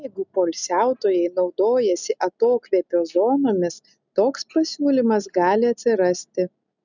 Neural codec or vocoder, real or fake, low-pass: none; real; 7.2 kHz